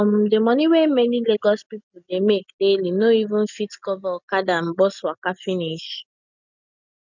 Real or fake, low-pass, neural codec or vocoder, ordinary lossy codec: real; 7.2 kHz; none; none